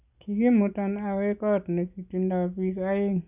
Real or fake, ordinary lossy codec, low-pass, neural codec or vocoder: real; none; 3.6 kHz; none